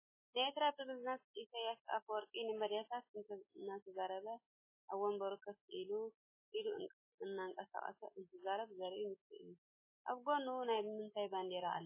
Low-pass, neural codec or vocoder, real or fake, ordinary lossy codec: 3.6 kHz; none; real; MP3, 16 kbps